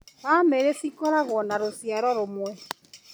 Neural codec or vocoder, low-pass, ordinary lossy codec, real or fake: none; none; none; real